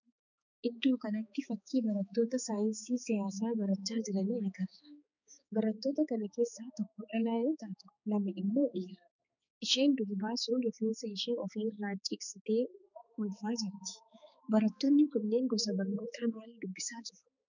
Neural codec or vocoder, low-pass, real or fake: codec, 16 kHz, 4 kbps, X-Codec, HuBERT features, trained on balanced general audio; 7.2 kHz; fake